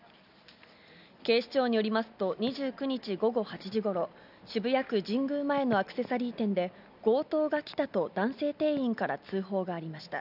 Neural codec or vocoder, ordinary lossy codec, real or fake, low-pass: none; none; real; 5.4 kHz